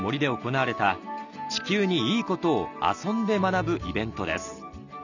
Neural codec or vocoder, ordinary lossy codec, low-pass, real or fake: none; none; 7.2 kHz; real